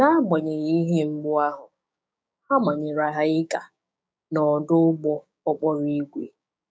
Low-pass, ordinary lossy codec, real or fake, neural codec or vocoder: none; none; fake; codec, 16 kHz, 6 kbps, DAC